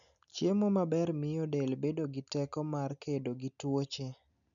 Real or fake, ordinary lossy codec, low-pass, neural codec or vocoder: real; none; 7.2 kHz; none